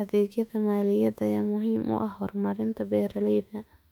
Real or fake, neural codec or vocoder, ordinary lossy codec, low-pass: fake; autoencoder, 48 kHz, 32 numbers a frame, DAC-VAE, trained on Japanese speech; none; 19.8 kHz